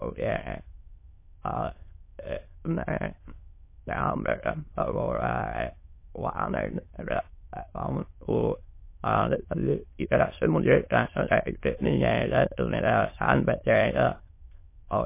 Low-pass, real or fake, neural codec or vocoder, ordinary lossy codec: 3.6 kHz; fake; autoencoder, 22.05 kHz, a latent of 192 numbers a frame, VITS, trained on many speakers; MP3, 24 kbps